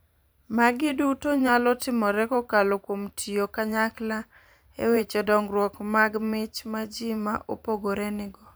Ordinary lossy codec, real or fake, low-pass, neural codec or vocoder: none; fake; none; vocoder, 44.1 kHz, 128 mel bands every 512 samples, BigVGAN v2